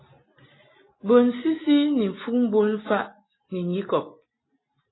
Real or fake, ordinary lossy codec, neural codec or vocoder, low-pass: real; AAC, 16 kbps; none; 7.2 kHz